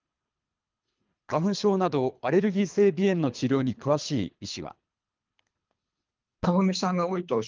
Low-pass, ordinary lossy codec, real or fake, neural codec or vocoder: 7.2 kHz; Opus, 32 kbps; fake; codec, 24 kHz, 3 kbps, HILCodec